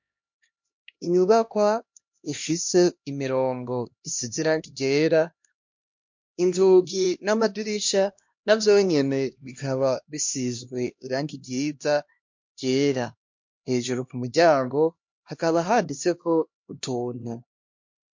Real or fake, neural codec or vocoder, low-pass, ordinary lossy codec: fake; codec, 16 kHz, 1 kbps, X-Codec, HuBERT features, trained on LibriSpeech; 7.2 kHz; MP3, 48 kbps